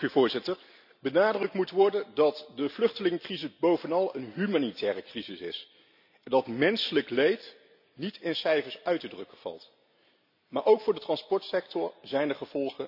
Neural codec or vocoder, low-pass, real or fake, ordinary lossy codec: none; 5.4 kHz; real; none